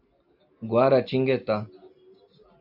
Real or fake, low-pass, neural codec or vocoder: real; 5.4 kHz; none